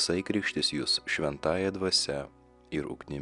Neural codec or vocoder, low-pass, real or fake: none; 10.8 kHz; real